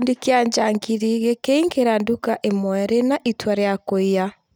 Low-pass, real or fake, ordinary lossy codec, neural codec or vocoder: none; real; none; none